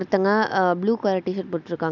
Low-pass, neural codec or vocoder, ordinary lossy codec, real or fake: 7.2 kHz; none; none; real